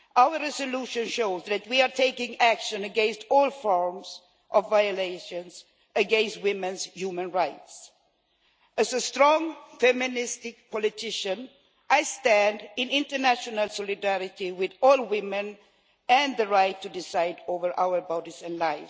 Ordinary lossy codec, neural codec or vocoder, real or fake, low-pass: none; none; real; none